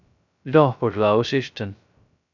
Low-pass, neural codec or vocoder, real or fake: 7.2 kHz; codec, 16 kHz, 0.2 kbps, FocalCodec; fake